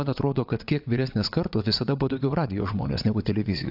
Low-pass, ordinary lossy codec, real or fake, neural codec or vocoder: 5.4 kHz; AAC, 48 kbps; fake; vocoder, 22.05 kHz, 80 mel bands, WaveNeXt